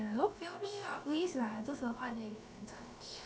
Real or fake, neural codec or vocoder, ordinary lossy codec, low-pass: fake; codec, 16 kHz, about 1 kbps, DyCAST, with the encoder's durations; none; none